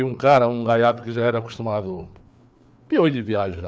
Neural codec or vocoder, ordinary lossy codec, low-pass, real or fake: codec, 16 kHz, 4 kbps, FreqCodec, larger model; none; none; fake